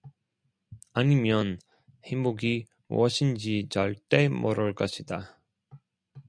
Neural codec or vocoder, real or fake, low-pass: none; real; 9.9 kHz